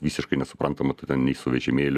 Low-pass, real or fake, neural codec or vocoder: 14.4 kHz; real; none